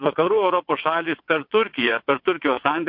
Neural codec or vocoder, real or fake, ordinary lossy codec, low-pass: vocoder, 22.05 kHz, 80 mel bands, WaveNeXt; fake; MP3, 48 kbps; 5.4 kHz